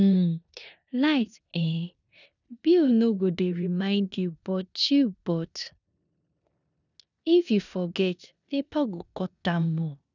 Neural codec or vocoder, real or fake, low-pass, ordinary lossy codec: codec, 16 kHz in and 24 kHz out, 0.9 kbps, LongCat-Audio-Codec, four codebook decoder; fake; 7.2 kHz; none